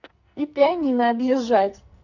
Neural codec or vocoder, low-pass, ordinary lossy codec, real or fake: codec, 44.1 kHz, 2.6 kbps, SNAC; 7.2 kHz; none; fake